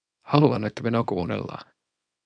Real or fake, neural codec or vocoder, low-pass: fake; codec, 24 kHz, 0.9 kbps, WavTokenizer, small release; 9.9 kHz